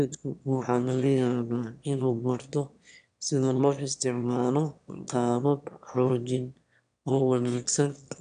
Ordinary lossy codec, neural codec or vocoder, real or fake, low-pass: none; autoencoder, 22.05 kHz, a latent of 192 numbers a frame, VITS, trained on one speaker; fake; 9.9 kHz